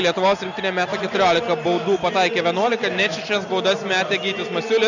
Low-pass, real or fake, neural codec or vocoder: 7.2 kHz; real; none